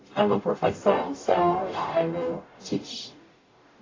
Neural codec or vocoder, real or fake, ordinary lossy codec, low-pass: codec, 44.1 kHz, 0.9 kbps, DAC; fake; AAC, 32 kbps; 7.2 kHz